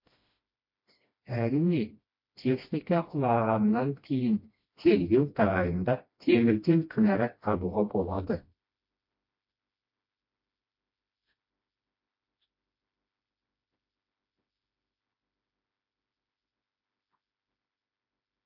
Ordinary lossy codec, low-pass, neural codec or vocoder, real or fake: MP3, 32 kbps; 5.4 kHz; codec, 16 kHz, 1 kbps, FreqCodec, smaller model; fake